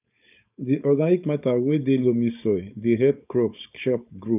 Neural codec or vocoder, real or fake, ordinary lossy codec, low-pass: codec, 16 kHz, 4.8 kbps, FACodec; fake; none; 3.6 kHz